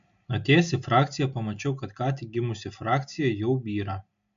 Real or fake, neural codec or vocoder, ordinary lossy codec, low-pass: real; none; MP3, 64 kbps; 7.2 kHz